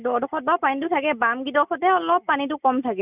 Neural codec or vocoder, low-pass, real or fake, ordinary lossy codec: none; 3.6 kHz; real; none